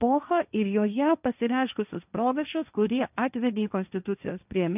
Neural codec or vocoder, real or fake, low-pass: codec, 16 kHz, 1.1 kbps, Voila-Tokenizer; fake; 3.6 kHz